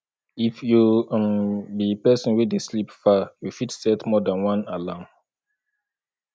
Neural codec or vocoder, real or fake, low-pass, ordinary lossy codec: none; real; none; none